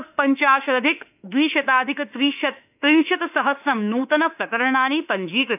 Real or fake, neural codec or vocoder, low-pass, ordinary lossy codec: fake; autoencoder, 48 kHz, 32 numbers a frame, DAC-VAE, trained on Japanese speech; 3.6 kHz; none